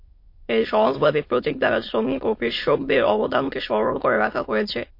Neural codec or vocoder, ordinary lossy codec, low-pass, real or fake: autoencoder, 22.05 kHz, a latent of 192 numbers a frame, VITS, trained on many speakers; MP3, 32 kbps; 5.4 kHz; fake